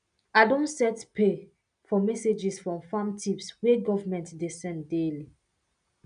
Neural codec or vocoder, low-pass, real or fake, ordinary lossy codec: none; 9.9 kHz; real; none